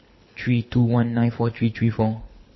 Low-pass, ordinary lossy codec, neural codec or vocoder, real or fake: 7.2 kHz; MP3, 24 kbps; codec, 24 kHz, 6 kbps, HILCodec; fake